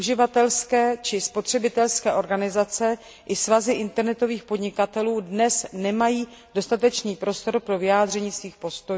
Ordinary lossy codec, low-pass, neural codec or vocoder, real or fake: none; none; none; real